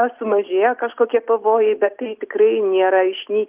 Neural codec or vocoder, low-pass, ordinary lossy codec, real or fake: none; 3.6 kHz; Opus, 24 kbps; real